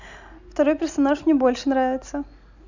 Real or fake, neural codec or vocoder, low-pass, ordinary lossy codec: real; none; 7.2 kHz; none